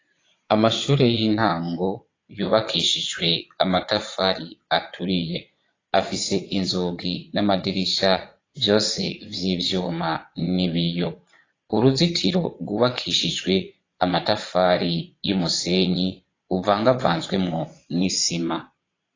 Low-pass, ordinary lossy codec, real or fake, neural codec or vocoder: 7.2 kHz; AAC, 32 kbps; fake; vocoder, 22.05 kHz, 80 mel bands, Vocos